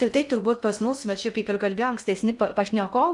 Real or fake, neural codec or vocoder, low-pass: fake; codec, 16 kHz in and 24 kHz out, 0.6 kbps, FocalCodec, streaming, 2048 codes; 10.8 kHz